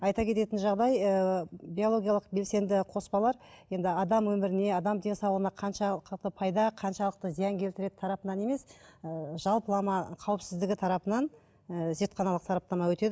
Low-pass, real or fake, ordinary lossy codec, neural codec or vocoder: none; real; none; none